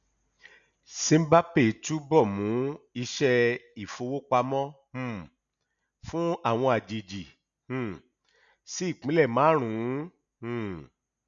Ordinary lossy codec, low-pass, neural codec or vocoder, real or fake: none; 7.2 kHz; none; real